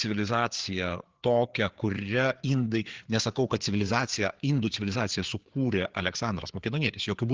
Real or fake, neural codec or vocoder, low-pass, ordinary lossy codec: fake; codec, 16 kHz, 4 kbps, FreqCodec, larger model; 7.2 kHz; Opus, 16 kbps